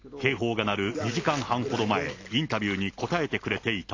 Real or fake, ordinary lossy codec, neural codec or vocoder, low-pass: real; AAC, 32 kbps; none; 7.2 kHz